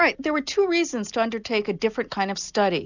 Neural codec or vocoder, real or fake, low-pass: none; real; 7.2 kHz